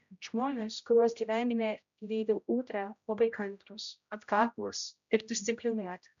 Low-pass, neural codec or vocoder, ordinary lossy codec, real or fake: 7.2 kHz; codec, 16 kHz, 0.5 kbps, X-Codec, HuBERT features, trained on general audio; MP3, 64 kbps; fake